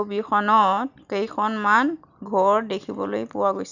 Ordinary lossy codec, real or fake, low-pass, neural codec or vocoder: none; real; 7.2 kHz; none